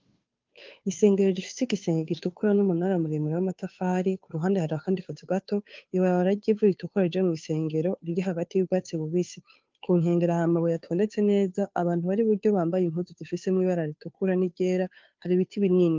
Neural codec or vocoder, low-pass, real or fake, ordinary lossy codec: codec, 16 kHz, 2 kbps, FunCodec, trained on Chinese and English, 25 frames a second; 7.2 kHz; fake; Opus, 24 kbps